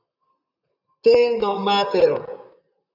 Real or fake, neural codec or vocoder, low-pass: fake; vocoder, 44.1 kHz, 128 mel bands, Pupu-Vocoder; 5.4 kHz